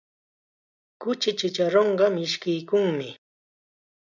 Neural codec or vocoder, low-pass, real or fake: none; 7.2 kHz; real